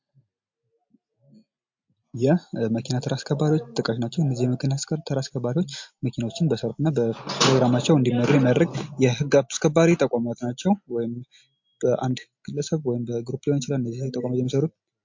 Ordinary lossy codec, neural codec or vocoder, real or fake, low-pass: MP3, 48 kbps; none; real; 7.2 kHz